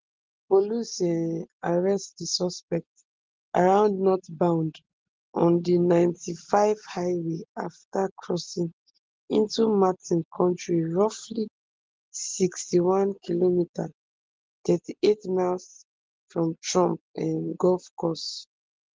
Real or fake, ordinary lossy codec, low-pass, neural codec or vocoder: real; Opus, 16 kbps; 7.2 kHz; none